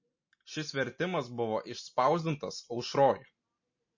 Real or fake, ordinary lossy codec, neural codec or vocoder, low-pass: real; MP3, 32 kbps; none; 7.2 kHz